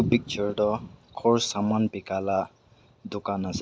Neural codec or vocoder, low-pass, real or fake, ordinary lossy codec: none; none; real; none